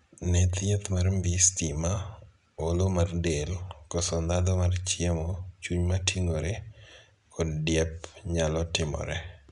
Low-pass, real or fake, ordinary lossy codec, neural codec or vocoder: 10.8 kHz; real; none; none